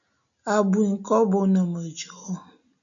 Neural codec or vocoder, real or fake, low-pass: none; real; 7.2 kHz